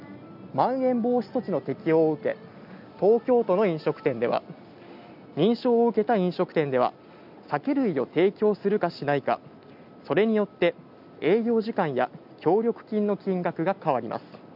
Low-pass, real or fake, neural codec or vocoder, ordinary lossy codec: 5.4 kHz; real; none; none